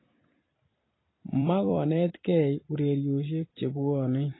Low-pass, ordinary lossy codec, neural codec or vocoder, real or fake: 7.2 kHz; AAC, 16 kbps; vocoder, 44.1 kHz, 128 mel bands every 256 samples, BigVGAN v2; fake